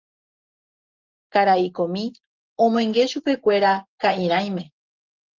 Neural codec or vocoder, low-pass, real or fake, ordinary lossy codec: none; 7.2 kHz; real; Opus, 32 kbps